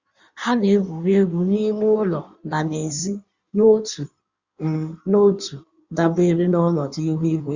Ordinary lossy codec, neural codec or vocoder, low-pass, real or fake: Opus, 64 kbps; codec, 16 kHz in and 24 kHz out, 1.1 kbps, FireRedTTS-2 codec; 7.2 kHz; fake